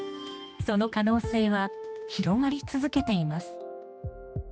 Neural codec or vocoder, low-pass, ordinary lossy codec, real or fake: codec, 16 kHz, 2 kbps, X-Codec, HuBERT features, trained on general audio; none; none; fake